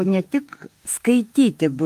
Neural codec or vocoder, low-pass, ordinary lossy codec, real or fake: autoencoder, 48 kHz, 32 numbers a frame, DAC-VAE, trained on Japanese speech; 14.4 kHz; Opus, 32 kbps; fake